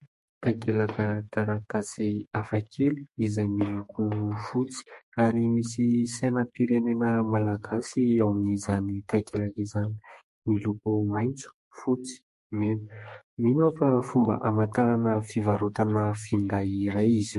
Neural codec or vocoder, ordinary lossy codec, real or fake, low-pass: codec, 32 kHz, 1.9 kbps, SNAC; MP3, 48 kbps; fake; 14.4 kHz